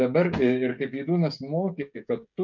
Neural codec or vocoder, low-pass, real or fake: codec, 16 kHz, 16 kbps, FreqCodec, smaller model; 7.2 kHz; fake